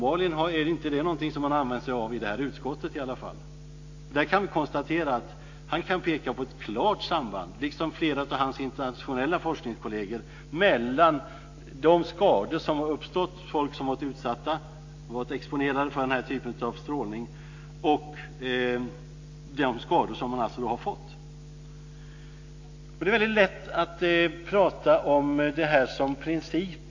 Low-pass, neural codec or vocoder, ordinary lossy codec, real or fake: 7.2 kHz; none; AAC, 48 kbps; real